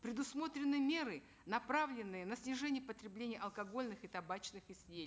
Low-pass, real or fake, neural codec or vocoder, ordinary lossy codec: none; real; none; none